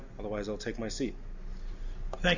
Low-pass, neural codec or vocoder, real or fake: 7.2 kHz; none; real